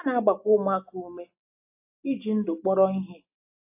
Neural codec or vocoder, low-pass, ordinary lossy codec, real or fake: none; 3.6 kHz; AAC, 32 kbps; real